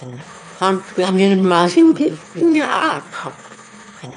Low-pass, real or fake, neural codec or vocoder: 9.9 kHz; fake; autoencoder, 22.05 kHz, a latent of 192 numbers a frame, VITS, trained on one speaker